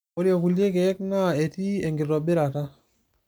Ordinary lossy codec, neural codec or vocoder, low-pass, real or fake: none; none; none; real